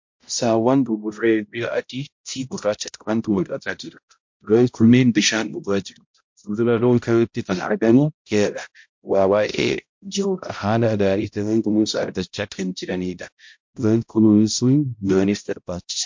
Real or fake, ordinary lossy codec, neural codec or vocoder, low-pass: fake; MP3, 48 kbps; codec, 16 kHz, 0.5 kbps, X-Codec, HuBERT features, trained on balanced general audio; 7.2 kHz